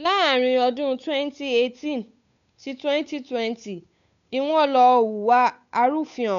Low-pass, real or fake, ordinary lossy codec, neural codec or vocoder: 7.2 kHz; fake; MP3, 96 kbps; codec, 16 kHz, 8 kbps, FunCodec, trained on Chinese and English, 25 frames a second